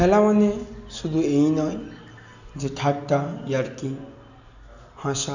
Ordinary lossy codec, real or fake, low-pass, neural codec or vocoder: none; real; 7.2 kHz; none